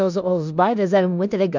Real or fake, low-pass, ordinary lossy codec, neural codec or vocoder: fake; 7.2 kHz; none; codec, 16 kHz in and 24 kHz out, 0.9 kbps, LongCat-Audio-Codec, four codebook decoder